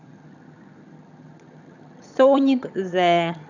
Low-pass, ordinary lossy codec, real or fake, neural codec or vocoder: 7.2 kHz; none; fake; vocoder, 22.05 kHz, 80 mel bands, HiFi-GAN